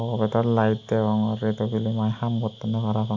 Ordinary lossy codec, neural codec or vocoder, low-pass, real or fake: AAC, 48 kbps; none; 7.2 kHz; real